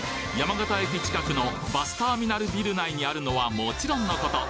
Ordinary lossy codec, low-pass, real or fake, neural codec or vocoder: none; none; real; none